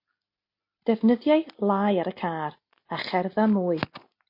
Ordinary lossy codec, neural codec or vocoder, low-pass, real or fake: MP3, 32 kbps; none; 5.4 kHz; real